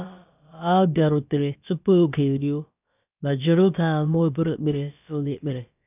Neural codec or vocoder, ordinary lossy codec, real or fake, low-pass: codec, 16 kHz, about 1 kbps, DyCAST, with the encoder's durations; none; fake; 3.6 kHz